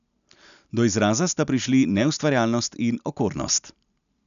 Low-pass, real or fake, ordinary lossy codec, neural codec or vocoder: 7.2 kHz; real; none; none